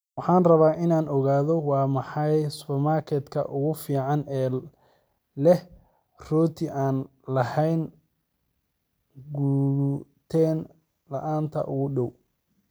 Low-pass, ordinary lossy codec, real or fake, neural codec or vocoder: none; none; real; none